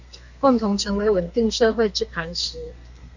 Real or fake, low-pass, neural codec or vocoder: fake; 7.2 kHz; codec, 32 kHz, 1.9 kbps, SNAC